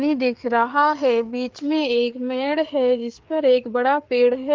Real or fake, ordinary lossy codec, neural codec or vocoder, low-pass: fake; Opus, 32 kbps; codec, 16 kHz, 4 kbps, FreqCodec, larger model; 7.2 kHz